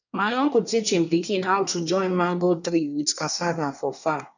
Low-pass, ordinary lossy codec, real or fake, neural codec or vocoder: 7.2 kHz; none; fake; codec, 24 kHz, 1 kbps, SNAC